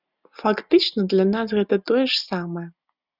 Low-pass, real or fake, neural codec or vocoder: 5.4 kHz; real; none